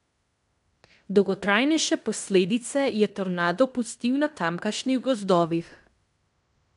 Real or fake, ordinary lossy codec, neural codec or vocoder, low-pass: fake; none; codec, 16 kHz in and 24 kHz out, 0.9 kbps, LongCat-Audio-Codec, fine tuned four codebook decoder; 10.8 kHz